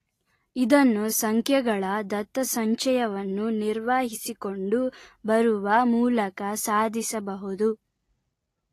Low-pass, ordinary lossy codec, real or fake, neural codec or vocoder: 14.4 kHz; AAC, 48 kbps; real; none